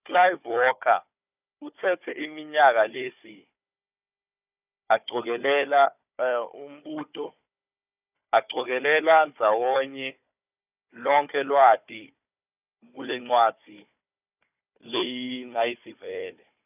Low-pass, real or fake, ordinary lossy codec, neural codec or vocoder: 3.6 kHz; fake; none; codec, 16 kHz, 4 kbps, FunCodec, trained on Chinese and English, 50 frames a second